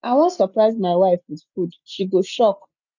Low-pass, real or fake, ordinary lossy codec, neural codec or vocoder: 7.2 kHz; real; none; none